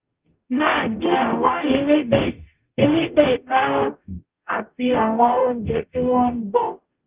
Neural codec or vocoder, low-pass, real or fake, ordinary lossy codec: codec, 44.1 kHz, 0.9 kbps, DAC; 3.6 kHz; fake; Opus, 24 kbps